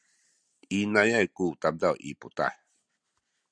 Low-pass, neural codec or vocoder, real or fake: 9.9 kHz; none; real